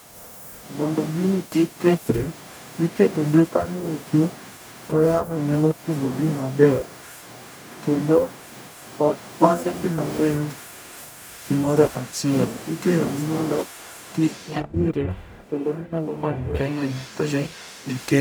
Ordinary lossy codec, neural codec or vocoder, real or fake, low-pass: none; codec, 44.1 kHz, 0.9 kbps, DAC; fake; none